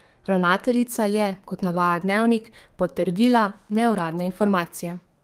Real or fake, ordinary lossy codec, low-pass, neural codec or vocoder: fake; Opus, 32 kbps; 14.4 kHz; codec, 32 kHz, 1.9 kbps, SNAC